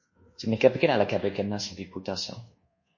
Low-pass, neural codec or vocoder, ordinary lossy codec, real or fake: 7.2 kHz; codec, 24 kHz, 1.2 kbps, DualCodec; MP3, 32 kbps; fake